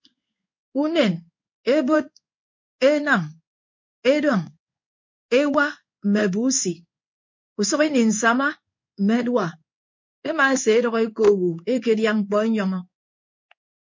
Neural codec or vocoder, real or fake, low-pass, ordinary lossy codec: codec, 16 kHz in and 24 kHz out, 1 kbps, XY-Tokenizer; fake; 7.2 kHz; MP3, 48 kbps